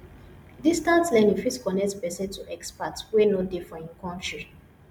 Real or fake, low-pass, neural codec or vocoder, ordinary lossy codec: real; 19.8 kHz; none; MP3, 96 kbps